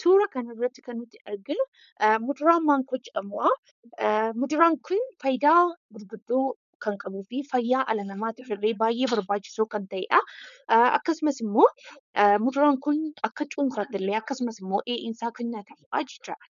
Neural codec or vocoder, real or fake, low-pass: codec, 16 kHz, 4.8 kbps, FACodec; fake; 7.2 kHz